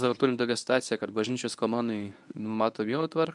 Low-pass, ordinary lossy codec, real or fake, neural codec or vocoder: 10.8 kHz; MP3, 96 kbps; fake; codec, 24 kHz, 0.9 kbps, WavTokenizer, medium speech release version 1